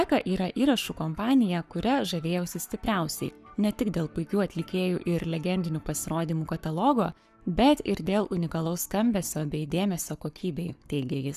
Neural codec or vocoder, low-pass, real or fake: codec, 44.1 kHz, 7.8 kbps, Pupu-Codec; 14.4 kHz; fake